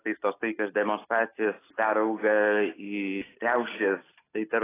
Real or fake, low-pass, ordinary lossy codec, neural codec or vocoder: real; 3.6 kHz; AAC, 16 kbps; none